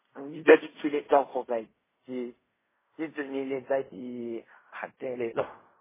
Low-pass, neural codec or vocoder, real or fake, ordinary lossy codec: 3.6 kHz; codec, 16 kHz in and 24 kHz out, 0.4 kbps, LongCat-Audio-Codec, fine tuned four codebook decoder; fake; MP3, 16 kbps